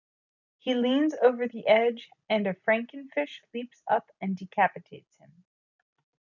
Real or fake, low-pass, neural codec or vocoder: real; 7.2 kHz; none